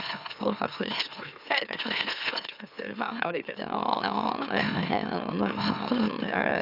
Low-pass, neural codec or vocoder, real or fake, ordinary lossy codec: 5.4 kHz; autoencoder, 44.1 kHz, a latent of 192 numbers a frame, MeloTTS; fake; none